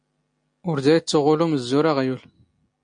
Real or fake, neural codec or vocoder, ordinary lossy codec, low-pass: real; none; MP3, 48 kbps; 9.9 kHz